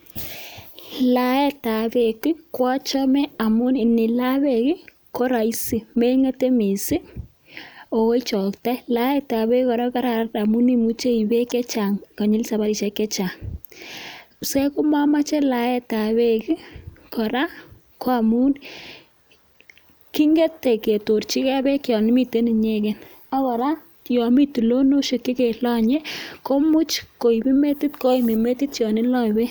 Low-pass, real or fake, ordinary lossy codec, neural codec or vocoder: none; real; none; none